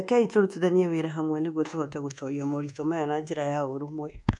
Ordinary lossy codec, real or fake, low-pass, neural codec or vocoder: MP3, 96 kbps; fake; 10.8 kHz; codec, 24 kHz, 1.2 kbps, DualCodec